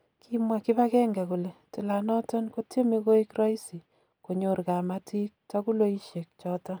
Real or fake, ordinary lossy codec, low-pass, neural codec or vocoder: real; none; none; none